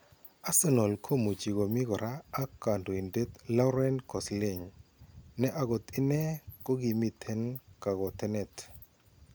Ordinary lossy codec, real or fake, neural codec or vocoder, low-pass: none; real; none; none